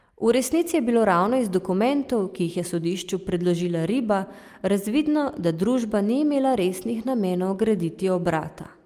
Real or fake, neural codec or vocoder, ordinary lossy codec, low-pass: real; none; Opus, 32 kbps; 14.4 kHz